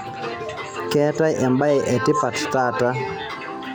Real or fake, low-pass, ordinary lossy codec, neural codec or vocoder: real; none; none; none